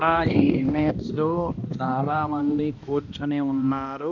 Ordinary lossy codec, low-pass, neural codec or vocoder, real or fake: none; 7.2 kHz; codec, 16 kHz, 1 kbps, X-Codec, HuBERT features, trained on balanced general audio; fake